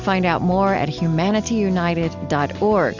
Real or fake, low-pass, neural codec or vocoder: real; 7.2 kHz; none